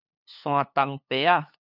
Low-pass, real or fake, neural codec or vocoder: 5.4 kHz; fake; codec, 16 kHz, 2 kbps, FunCodec, trained on LibriTTS, 25 frames a second